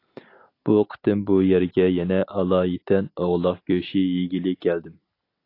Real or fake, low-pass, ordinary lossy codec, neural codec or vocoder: real; 5.4 kHz; AAC, 32 kbps; none